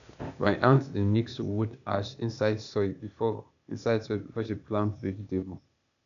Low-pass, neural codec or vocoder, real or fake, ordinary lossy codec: 7.2 kHz; codec, 16 kHz, 0.8 kbps, ZipCodec; fake; none